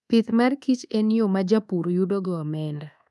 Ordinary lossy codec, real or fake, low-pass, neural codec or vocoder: none; fake; none; codec, 24 kHz, 1.2 kbps, DualCodec